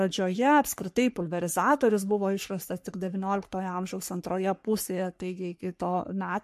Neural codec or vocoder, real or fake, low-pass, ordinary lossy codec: codec, 44.1 kHz, 3.4 kbps, Pupu-Codec; fake; 14.4 kHz; MP3, 64 kbps